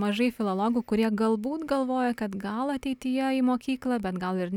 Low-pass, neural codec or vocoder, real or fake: 19.8 kHz; none; real